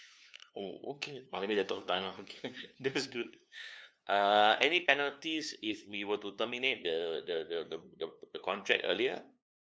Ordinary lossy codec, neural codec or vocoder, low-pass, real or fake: none; codec, 16 kHz, 2 kbps, FunCodec, trained on LibriTTS, 25 frames a second; none; fake